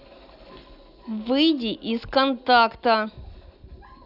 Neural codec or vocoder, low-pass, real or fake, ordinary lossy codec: none; 5.4 kHz; real; none